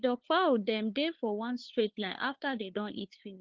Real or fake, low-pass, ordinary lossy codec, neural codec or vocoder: fake; 7.2 kHz; Opus, 16 kbps; codec, 16 kHz, 2 kbps, FunCodec, trained on LibriTTS, 25 frames a second